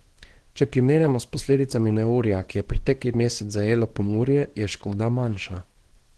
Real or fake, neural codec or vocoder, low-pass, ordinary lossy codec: fake; codec, 24 kHz, 0.9 kbps, WavTokenizer, small release; 10.8 kHz; Opus, 16 kbps